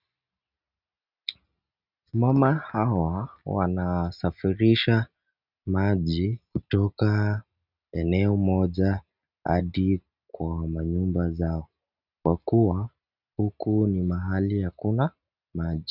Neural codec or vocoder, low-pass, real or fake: none; 5.4 kHz; real